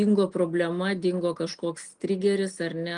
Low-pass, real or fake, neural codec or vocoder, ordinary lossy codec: 9.9 kHz; real; none; Opus, 32 kbps